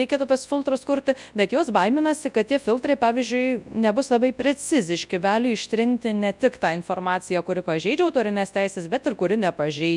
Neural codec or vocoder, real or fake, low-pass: codec, 24 kHz, 0.9 kbps, WavTokenizer, large speech release; fake; 10.8 kHz